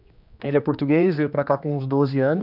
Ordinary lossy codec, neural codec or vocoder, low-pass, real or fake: none; codec, 16 kHz, 2 kbps, X-Codec, HuBERT features, trained on general audio; 5.4 kHz; fake